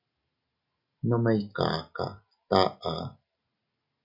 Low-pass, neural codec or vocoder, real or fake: 5.4 kHz; none; real